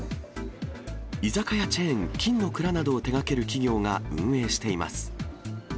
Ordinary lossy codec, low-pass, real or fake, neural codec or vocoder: none; none; real; none